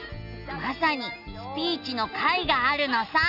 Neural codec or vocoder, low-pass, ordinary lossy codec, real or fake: none; 5.4 kHz; none; real